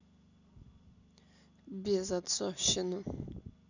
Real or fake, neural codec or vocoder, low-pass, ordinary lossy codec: real; none; 7.2 kHz; none